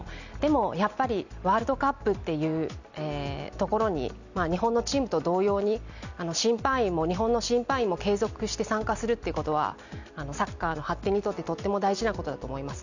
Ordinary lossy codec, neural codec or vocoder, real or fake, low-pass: none; none; real; 7.2 kHz